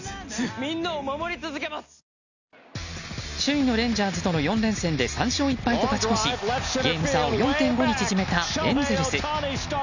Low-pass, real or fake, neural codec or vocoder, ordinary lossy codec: 7.2 kHz; real; none; none